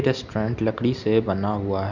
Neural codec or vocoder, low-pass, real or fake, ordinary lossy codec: none; 7.2 kHz; real; none